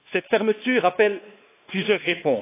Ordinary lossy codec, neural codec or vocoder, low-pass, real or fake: AAC, 24 kbps; codec, 16 kHz, 2 kbps, X-Codec, WavLM features, trained on Multilingual LibriSpeech; 3.6 kHz; fake